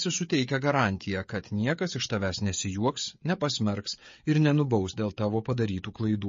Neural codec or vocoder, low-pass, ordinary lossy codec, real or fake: codec, 16 kHz, 16 kbps, FreqCodec, smaller model; 7.2 kHz; MP3, 32 kbps; fake